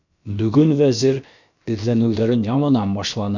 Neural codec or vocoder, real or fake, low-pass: codec, 16 kHz, about 1 kbps, DyCAST, with the encoder's durations; fake; 7.2 kHz